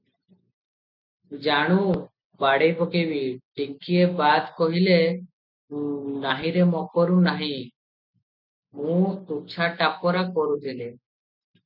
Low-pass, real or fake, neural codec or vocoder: 5.4 kHz; real; none